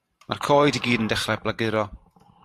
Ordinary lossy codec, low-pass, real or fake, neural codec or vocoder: AAC, 64 kbps; 14.4 kHz; fake; vocoder, 44.1 kHz, 128 mel bands every 512 samples, BigVGAN v2